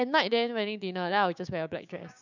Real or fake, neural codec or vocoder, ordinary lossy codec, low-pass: fake; codec, 16 kHz, 6 kbps, DAC; none; 7.2 kHz